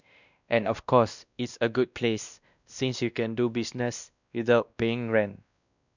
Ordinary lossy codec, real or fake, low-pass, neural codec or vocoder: none; fake; 7.2 kHz; codec, 16 kHz, 1 kbps, X-Codec, WavLM features, trained on Multilingual LibriSpeech